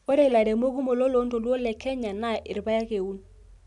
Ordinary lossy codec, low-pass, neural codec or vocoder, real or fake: none; 10.8 kHz; none; real